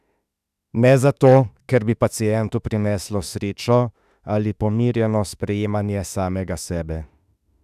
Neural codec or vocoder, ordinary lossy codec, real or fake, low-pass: autoencoder, 48 kHz, 32 numbers a frame, DAC-VAE, trained on Japanese speech; none; fake; 14.4 kHz